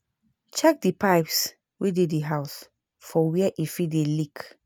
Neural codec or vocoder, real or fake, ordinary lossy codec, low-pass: none; real; none; none